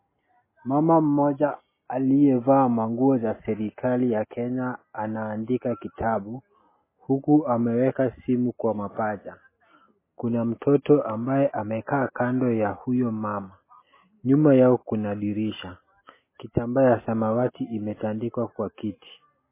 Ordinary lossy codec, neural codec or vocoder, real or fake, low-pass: MP3, 16 kbps; none; real; 3.6 kHz